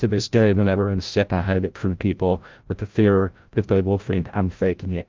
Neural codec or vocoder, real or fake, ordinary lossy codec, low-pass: codec, 16 kHz, 0.5 kbps, FreqCodec, larger model; fake; Opus, 32 kbps; 7.2 kHz